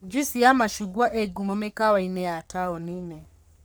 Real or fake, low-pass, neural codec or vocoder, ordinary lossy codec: fake; none; codec, 44.1 kHz, 3.4 kbps, Pupu-Codec; none